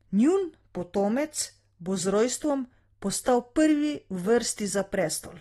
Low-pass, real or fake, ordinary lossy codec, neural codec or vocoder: 19.8 kHz; real; AAC, 32 kbps; none